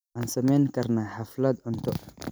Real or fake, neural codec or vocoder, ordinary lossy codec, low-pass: fake; vocoder, 44.1 kHz, 128 mel bands every 256 samples, BigVGAN v2; none; none